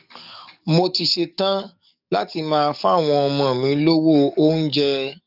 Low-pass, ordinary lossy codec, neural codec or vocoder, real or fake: 5.4 kHz; none; codec, 44.1 kHz, 7.8 kbps, DAC; fake